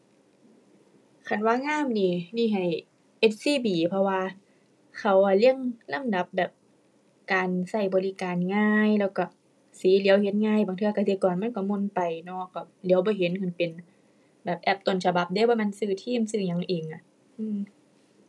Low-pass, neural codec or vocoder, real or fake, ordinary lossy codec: none; none; real; none